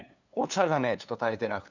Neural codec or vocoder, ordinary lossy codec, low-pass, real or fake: codec, 16 kHz, 2 kbps, FunCodec, trained on LibriTTS, 25 frames a second; none; 7.2 kHz; fake